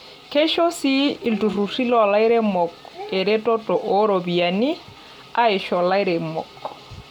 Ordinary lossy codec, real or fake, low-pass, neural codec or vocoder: none; real; 19.8 kHz; none